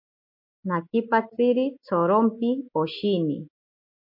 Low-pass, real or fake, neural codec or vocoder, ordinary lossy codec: 5.4 kHz; real; none; MP3, 24 kbps